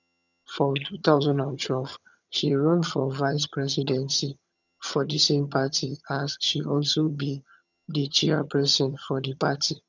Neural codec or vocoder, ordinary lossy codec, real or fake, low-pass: vocoder, 22.05 kHz, 80 mel bands, HiFi-GAN; none; fake; 7.2 kHz